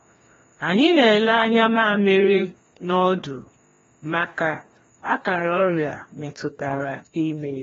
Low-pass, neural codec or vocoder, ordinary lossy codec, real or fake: 7.2 kHz; codec, 16 kHz, 1 kbps, FreqCodec, larger model; AAC, 24 kbps; fake